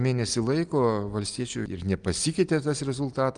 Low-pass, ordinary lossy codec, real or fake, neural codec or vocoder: 9.9 kHz; Opus, 32 kbps; real; none